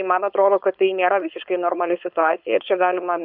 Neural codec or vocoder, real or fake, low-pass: codec, 16 kHz, 4.8 kbps, FACodec; fake; 5.4 kHz